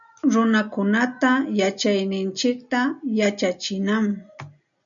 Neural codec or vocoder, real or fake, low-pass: none; real; 7.2 kHz